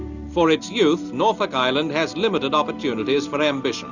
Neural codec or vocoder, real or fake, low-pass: none; real; 7.2 kHz